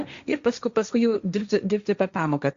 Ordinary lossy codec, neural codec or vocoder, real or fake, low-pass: Opus, 64 kbps; codec, 16 kHz, 1.1 kbps, Voila-Tokenizer; fake; 7.2 kHz